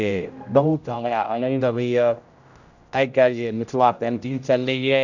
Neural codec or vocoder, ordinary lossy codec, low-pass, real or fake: codec, 16 kHz, 0.5 kbps, X-Codec, HuBERT features, trained on general audio; none; 7.2 kHz; fake